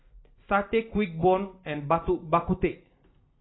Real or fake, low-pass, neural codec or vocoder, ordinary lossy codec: real; 7.2 kHz; none; AAC, 16 kbps